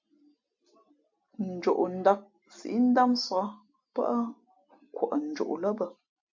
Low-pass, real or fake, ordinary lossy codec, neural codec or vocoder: 7.2 kHz; real; MP3, 64 kbps; none